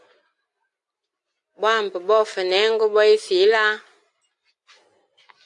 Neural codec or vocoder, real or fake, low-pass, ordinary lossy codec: none; real; 10.8 kHz; AAC, 64 kbps